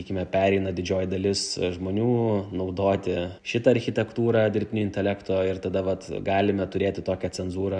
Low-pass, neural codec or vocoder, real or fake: 9.9 kHz; none; real